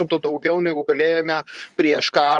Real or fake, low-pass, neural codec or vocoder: fake; 10.8 kHz; codec, 24 kHz, 0.9 kbps, WavTokenizer, medium speech release version 2